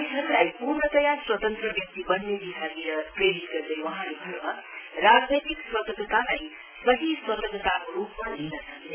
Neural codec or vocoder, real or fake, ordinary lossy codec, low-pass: none; real; none; 3.6 kHz